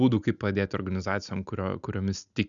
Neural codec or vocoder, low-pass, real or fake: none; 7.2 kHz; real